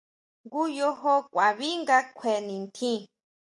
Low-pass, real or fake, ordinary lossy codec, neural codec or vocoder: 10.8 kHz; real; MP3, 48 kbps; none